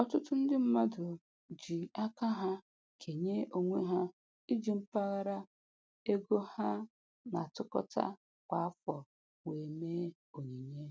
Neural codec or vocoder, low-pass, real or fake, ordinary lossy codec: none; none; real; none